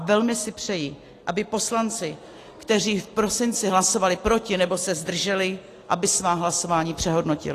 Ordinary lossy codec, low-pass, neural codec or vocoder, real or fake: AAC, 48 kbps; 14.4 kHz; none; real